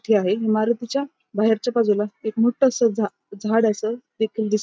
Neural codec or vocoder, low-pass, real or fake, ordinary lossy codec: none; none; real; none